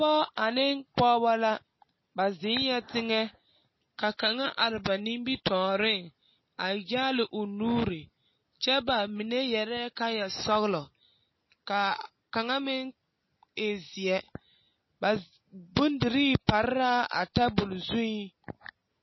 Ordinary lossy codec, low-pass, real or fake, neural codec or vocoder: MP3, 24 kbps; 7.2 kHz; real; none